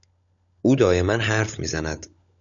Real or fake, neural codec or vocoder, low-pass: fake; codec, 16 kHz, 16 kbps, FunCodec, trained on LibriTTS, 50 frames a second; 7.2 kHz